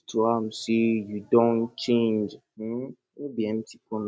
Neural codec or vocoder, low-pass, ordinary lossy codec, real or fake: none; none; none; real